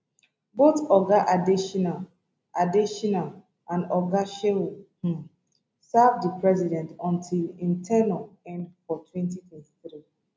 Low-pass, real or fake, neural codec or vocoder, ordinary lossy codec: none; real; none; none